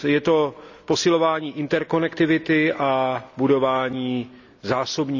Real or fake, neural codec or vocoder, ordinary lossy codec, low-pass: real; none; none; 7.2 kHz